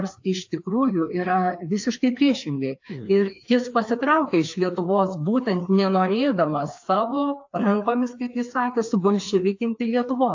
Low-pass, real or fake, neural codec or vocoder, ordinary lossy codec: 7.2 kHz; fake; codec, 16 kHz, 2 kbps, FreqCodec, larger model; AAC, 48 kbps